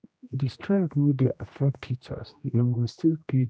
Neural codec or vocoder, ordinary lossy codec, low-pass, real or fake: codec, 16 kHz, 1 kbps, X-Codec, HuBERT features, trained on general audio; none; none; fake